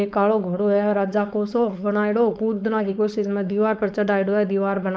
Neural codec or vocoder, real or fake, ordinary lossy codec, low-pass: codec, 16 kHz, 4.8 kbps, FACodec; fake; none; none